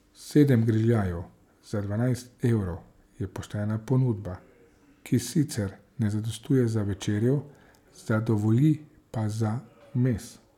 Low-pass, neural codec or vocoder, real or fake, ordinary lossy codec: 19.8 kHz; none; real; none